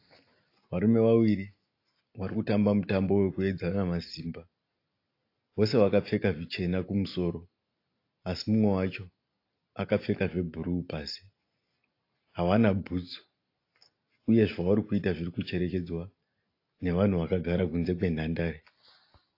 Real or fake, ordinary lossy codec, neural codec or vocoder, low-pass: real; AAC, 32 kbps; none; 5.4 kHz